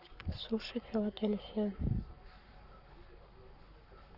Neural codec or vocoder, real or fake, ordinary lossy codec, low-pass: codec, 44.1 kHz, 7.8 kbps, Pupu-Codec; fake; Opus, 64 kbps; 5.4 kHz